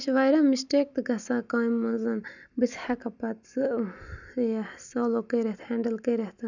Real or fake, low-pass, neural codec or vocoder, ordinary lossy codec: real; 7.2 kHz; none; none